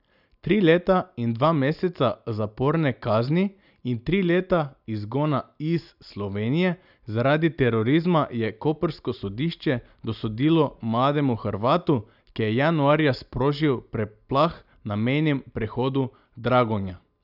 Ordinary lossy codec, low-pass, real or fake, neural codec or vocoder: none; 5.4 kHz; real; none